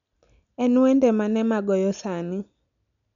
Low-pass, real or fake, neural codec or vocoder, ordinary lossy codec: 7.2 kHz; real; none; none